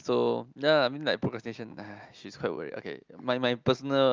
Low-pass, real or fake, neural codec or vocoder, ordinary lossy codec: 7.2 kHz; real; none; Opus, 24 kbps